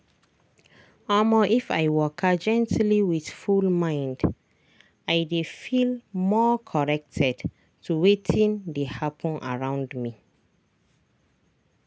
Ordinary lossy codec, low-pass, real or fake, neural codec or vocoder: none; none; real; none